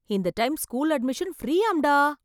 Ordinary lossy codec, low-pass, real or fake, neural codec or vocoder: none; 19.8 kHz; real; none